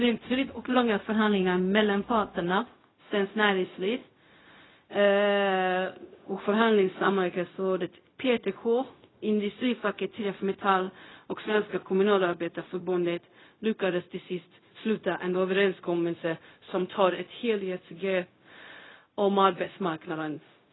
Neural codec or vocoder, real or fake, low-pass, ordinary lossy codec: codec, 16 kHz, 0.4 kbps, LongCat-Audio-Codec; fake; 7.2 kHz; AAC, 16 kbps